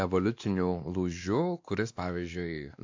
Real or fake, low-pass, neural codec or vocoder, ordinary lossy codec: fake; 7.2 kHz; codec, 16 kHz, 2 kbps, X-Codec, WavLM features, trained on Multilingual LibriSpeech; AAC, 48 kbps